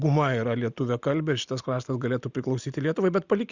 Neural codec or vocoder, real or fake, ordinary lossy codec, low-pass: none; real; Opus, 64 kbps; 7.2 kHz